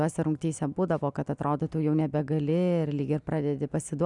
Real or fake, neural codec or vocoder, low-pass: real; none; 10.8 kHz